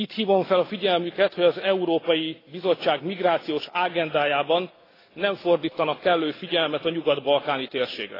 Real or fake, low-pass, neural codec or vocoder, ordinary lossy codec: real; 5.4 kHz; none; AAC, 24 kbps